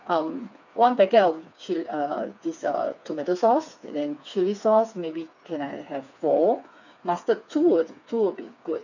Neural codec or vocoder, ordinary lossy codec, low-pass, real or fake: codec, 16 kHz, 4 kbps, FreqCodec, smaller model; none; 7.2 kHz; fake